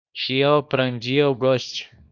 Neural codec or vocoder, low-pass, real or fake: codec, 24 kHz, 0.9 kbps, WavTokenizer, small release; 7.2 kHz; fake